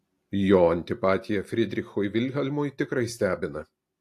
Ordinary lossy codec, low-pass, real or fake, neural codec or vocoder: AAC, 64 kbps; 14.4 kHz; real; none